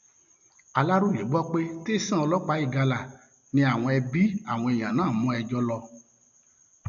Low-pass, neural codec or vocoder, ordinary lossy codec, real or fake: 7.2 kHz; none; none; real